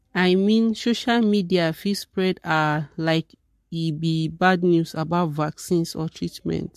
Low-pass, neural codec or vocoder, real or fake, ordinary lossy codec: 14.4 kHz; codec, 44.1 kHz, 7.8 kbps, Pupu-Codec; fake; MP3, 64 kbps